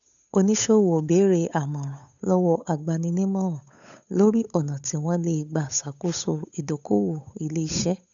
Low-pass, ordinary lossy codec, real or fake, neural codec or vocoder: 7.2 kHz; none; fake; codec, 16 kHz, 8 kbps, FunCodec, trained on Chinese and English, 25 frames a second